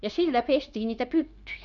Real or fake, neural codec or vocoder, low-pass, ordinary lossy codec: fake; codec, 16 kHz, 0.9 kbps, LongCat-Audio-Codec; 7.2 kHz; none